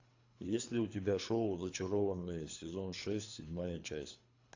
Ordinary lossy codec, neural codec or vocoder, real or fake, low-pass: AAC, 48 kbps; codec, 24 kHz, 3 kbps, HILCodec; fake; 7.2 kHz